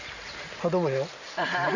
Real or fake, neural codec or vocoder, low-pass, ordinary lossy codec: real; none; 7.2 kHz; none